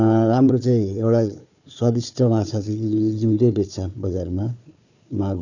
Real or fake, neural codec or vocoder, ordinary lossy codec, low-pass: fake; codec, 16 kHz, 4 kbps, FunCodec, trained on Chinese and English, 50 frames a second; none; 7.2 kHz